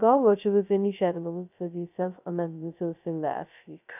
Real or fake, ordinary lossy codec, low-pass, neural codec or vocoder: fake; none; 3.6 kHz; codec, 16 kHz, 0.2 kbps, FocalCodec